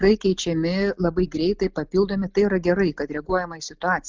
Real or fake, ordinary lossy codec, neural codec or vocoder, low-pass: real; Opus, 24 kbps; none; 7.2 kHz